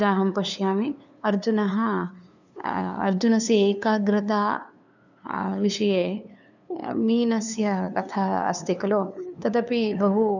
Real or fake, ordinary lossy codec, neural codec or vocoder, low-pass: fake; none; codec, 16 kHz, 2 kbps, FunCodec, trained on LibriTTS, 25 frames a second; 7.2 kHz